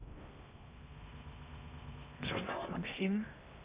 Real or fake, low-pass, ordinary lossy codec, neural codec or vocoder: fake; 3.6 kHz; Opus, 64 kbps; codec, 16 kHz in and 24 kHz out, 0.6 kbps, FocalCodec, streaming, 2048 codes